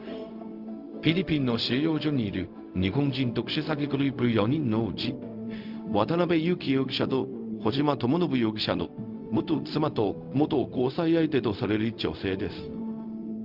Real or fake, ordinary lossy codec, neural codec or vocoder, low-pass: fake; Opus, 24 kbps; codec, 16 kHz, 0.4 kbps, LongCat-Audio-Codec; 5.4 kHz